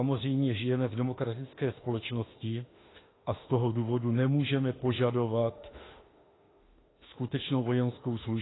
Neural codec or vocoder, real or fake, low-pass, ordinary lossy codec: autoencoder, 48 kHz, 32 numbers a frame, DAC-VAE, trained on Japanese speech; fake; 7.2 kHz; AAC, 16 kbps